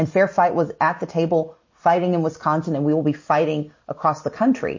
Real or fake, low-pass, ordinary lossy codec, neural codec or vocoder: real; 7.2 kHz; MP3, 32 kbps; none